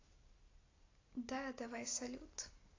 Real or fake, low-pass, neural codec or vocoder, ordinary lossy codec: real; 7.2 kHz; none; AAC, 32 kbps